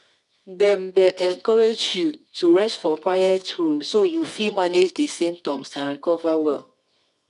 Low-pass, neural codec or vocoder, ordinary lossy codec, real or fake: 10.8 kHz; codec, 24 kHz, 0.9 kbps, WavTokenizer, medium music audio release; none; fake